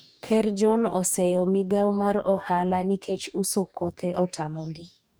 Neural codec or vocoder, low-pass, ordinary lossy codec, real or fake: codec, 44.1 kHz, 2.6 kbps, DAC; none; none; fake